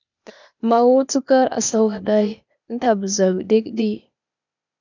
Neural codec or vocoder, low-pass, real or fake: codec, 16 kHz, 0.8 kbps, ZipCodec; 7.2 kHz; fake